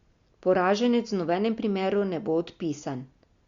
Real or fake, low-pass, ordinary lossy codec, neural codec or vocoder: real; 7.2 kHz; Opus, 64 kbps; none